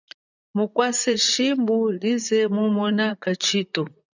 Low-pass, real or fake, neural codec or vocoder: 7.2 kHz; fake; vocoder, 44.1 kHz, 128 mel bands, Pupu-Vocoder